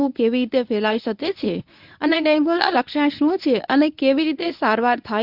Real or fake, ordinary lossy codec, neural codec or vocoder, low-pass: fake; none; codec, 24 kHz, 0.9 kbps, WavTokenizer, medium speech release version 1; 5.4 kHz